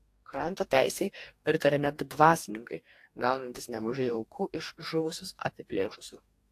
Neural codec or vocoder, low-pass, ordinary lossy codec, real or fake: codec, 44.1 kHz, 2.6 kbps, DAC; 14.4 kHz; AAC, 64 kbps; fake